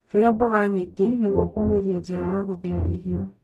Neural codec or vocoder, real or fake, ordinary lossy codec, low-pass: codec, 44.1 kHz, 0.9 kbps, DAC; fake; MP3, 96 kbps; 14.4 kHz